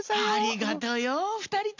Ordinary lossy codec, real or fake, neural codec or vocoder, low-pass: AAC, 48 kbps; real; none; 7.2 kHz